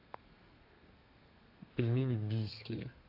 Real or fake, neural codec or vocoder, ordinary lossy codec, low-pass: fake; codec, 32 kHz, 1.9 kbps, SNAC; AAC, 32 kbps; 5.4 kHz